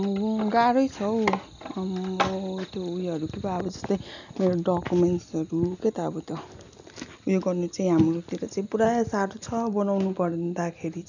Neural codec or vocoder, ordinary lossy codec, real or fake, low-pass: none; none; real; 7.2 kHz